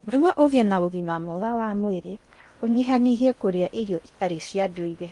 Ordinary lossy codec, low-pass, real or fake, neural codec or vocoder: Opus, 32 kbps; 10.8 kHz; fake; codec, 16 kHz in and 24 kHz out, 0.6 kbps, FocalCodec, streaming, 2048 codes